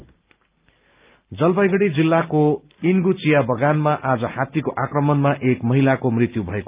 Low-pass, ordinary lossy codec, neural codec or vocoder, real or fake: 3.6 kHz; Opus, 24 kbps; none; real